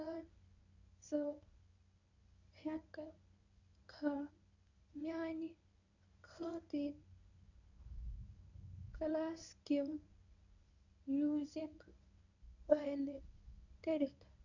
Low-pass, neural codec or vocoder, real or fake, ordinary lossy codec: 7.2 kHz; codec, 24 kHz, 0.9 kbps, WavTokenizer, medium speech release version 1; fake; none